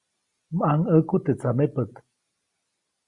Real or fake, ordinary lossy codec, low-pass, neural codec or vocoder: real; Opus, 64 kbps; 10.8 kHz; none